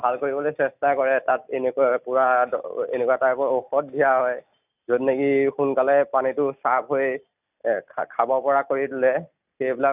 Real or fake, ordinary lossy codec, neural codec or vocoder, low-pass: real; none; none; 3.6 kHz